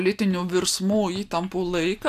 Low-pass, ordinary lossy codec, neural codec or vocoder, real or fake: 14.4 kHz; AAC, 96 kbps; none; real